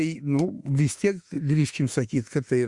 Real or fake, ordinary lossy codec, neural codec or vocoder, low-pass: fake; Opus, 64 kbps; autoencoder, 48 kHz, 32 numbers a frame, DAC-VAE, trained on Japanese speech; 10.8 kHz